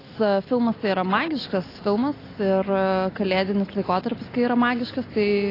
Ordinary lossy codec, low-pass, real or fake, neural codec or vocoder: AAC, 24 kbps; 5.4 kHz; real; none